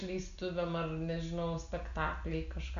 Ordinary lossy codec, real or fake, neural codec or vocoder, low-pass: AAC, 96 kbps; real; none; 7.2 kHz